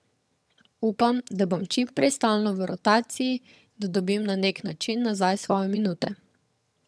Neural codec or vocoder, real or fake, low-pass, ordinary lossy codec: vocoder, 22.05 kHz, 80 mel bands, HiFi-GAN; fake; none; none